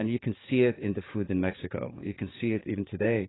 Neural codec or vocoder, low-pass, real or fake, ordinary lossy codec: codec, 16 kHz, 6 kbps, DAC; 7.2 kHz; fake; AAC, 16 kbps